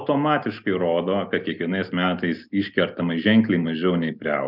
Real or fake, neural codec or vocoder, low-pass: real; none; 5.4 kHz